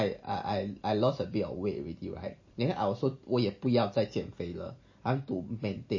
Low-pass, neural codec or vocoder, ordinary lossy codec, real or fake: 7.2 kHz; none; MP3, 32 kbps; real